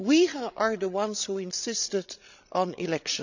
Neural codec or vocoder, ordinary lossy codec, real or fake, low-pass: codec, 16 kHz, 16 kbps, FreqCodec, larger model; none; fake; 7.2 kHz